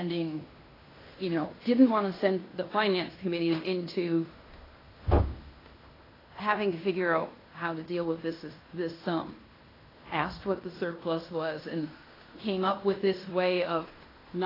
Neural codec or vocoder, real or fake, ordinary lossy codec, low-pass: codec, 16 kHz in and 24 kHz out, 0.9 kbps, LongCat-Audio-Codec, fine tuned four codebook decoder; fake; AAC, 24 kbps; 5.4 kHz